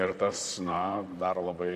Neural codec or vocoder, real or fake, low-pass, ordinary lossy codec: vocoder, 44.1 kHz, 128 mel bands, Pupu-Vocoder; fake; 14.4 kHz; Opus, 64 kbps